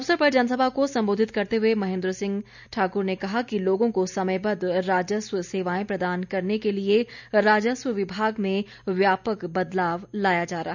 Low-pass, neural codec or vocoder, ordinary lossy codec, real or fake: 7.2 kHz; none; none; real